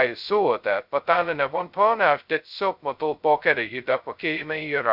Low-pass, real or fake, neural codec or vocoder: 5.4 kHz; fake; codec, 16 kHz, 0.2 kbps, FocalCodec